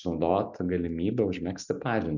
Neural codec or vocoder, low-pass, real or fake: none; 7.2 kHz; real